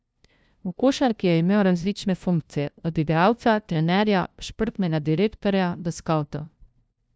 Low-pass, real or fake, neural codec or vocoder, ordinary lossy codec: none; fake; codec, 16 kHz, 0.5 kbps, FunCodec, trained on LibriTTS, 25 frames a second; none